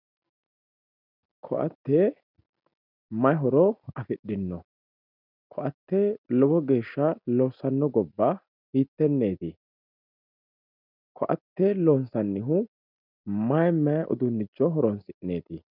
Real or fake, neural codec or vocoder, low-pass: real; none; 5.4 kHz